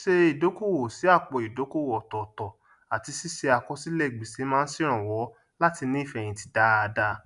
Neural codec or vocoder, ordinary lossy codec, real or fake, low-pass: none; none; real; 10.8 kHz